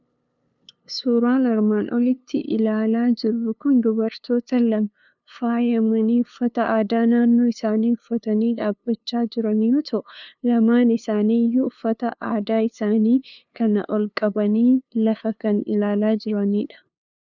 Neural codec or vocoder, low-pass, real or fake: codec, 16 kHz, 2 kbps, FunCodec, trained on LibriTTS, 25 frames a second; 7.2 kHz; fake